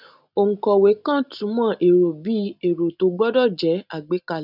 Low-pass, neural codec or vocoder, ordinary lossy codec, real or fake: 5.4 kHz; none; none; real